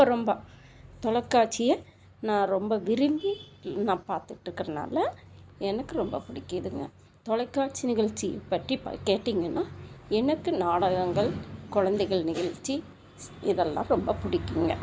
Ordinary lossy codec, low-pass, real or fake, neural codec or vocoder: none; none; real; none